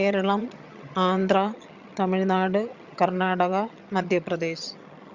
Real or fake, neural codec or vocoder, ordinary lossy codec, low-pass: fake; vocoder, 22.05 kHz, 80 mel bands, HiFi-GAN; Opus, 64 kbps; 7.2 kHz